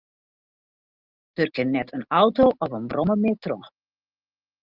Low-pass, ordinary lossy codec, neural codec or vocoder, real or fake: 5.4 kHz; Opus, 32 kbps; none; real